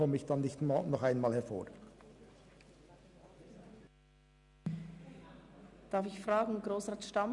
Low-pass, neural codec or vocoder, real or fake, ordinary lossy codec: 10.8 kHz; none; real; Opus, 64 kbps